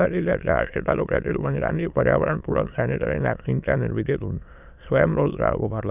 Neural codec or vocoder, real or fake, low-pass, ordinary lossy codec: autoencoder, 22.05 kHz, a latent of 192 numbers a frame, VITS, trained on many speakers; fake; 3.6 kHz; none